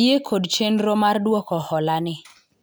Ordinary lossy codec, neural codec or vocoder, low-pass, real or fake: none; none; none; real